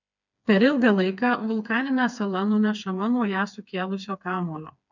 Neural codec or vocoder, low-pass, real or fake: codec, 16 kHz, 4 kbps, FreqCodec, smaller model; 7.2 kHz; fake